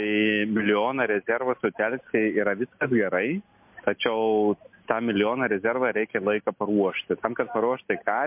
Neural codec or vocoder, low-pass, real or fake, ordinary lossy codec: none; 3.6 kHz; real; MP3, 32 kbps